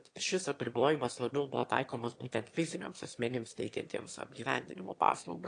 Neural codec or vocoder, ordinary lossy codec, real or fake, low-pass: autoencoder, 22.05 kHz, a latent of 192 numbers a frame, VITS, trained on one speaker; AAC, 48 kbps; fake; 9.9 kHz